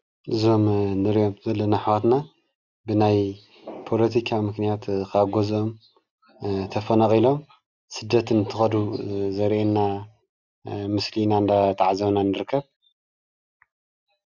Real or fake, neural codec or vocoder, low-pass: real; none; 7.2 kHz